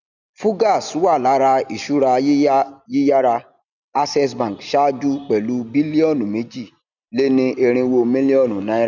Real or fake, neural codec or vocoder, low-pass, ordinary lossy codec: real; none; 7.2 kHz; none